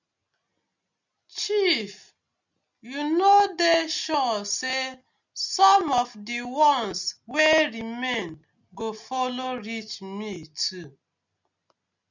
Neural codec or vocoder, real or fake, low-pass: none; real; 7.2 kHz